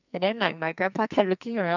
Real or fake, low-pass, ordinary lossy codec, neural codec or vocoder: fake; 7.2 kHz; none; codec, 44.1 kHz, 2.6 kbps, SNAC